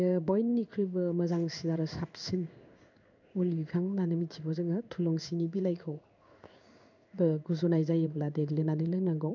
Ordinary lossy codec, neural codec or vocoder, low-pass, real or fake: none; codec, 16 kHz in and 24 kHz out, 1 kbps, XY-Tokenizer; 7.2 kHz; fake